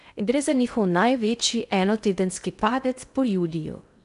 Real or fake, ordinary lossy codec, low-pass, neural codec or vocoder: fake; none; 10.8 kHz; codec, 16 kHz in and 24 kHz out, 0.6 kbps, FocalCodec, streaming, 2048 codes